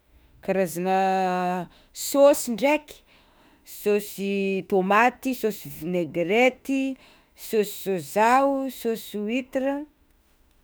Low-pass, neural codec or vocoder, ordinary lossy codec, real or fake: none; autoencoder, 48 kHz, 32 numbers a frame, DAC-VAE, trained on Japanese speech; none; fake